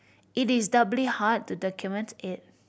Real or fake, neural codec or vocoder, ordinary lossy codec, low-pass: real; none; none; none